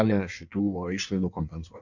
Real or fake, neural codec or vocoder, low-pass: fake; codec, 16 kHz in and 24 kHz out, 1.1 kbps, FireRedTTS-2 codec; 7.2 kHz